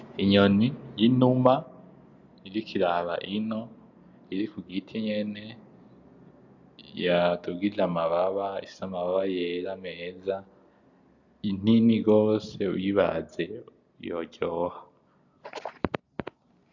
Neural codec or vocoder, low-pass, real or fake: none; 7.2 kHz; real